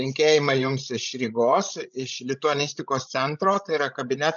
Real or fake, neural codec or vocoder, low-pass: fake; codec, 16 kHz, 16 kbps, FreqCodec, larger model; 7.2 kHz